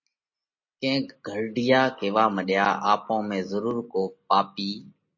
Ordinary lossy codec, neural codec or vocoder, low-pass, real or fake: MP3, 32 kbps; none; 7.2 kHz; real